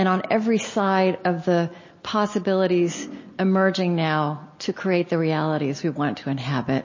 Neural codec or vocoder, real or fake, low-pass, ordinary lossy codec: none; real; 7.2 kHz; MP3, 32 kbps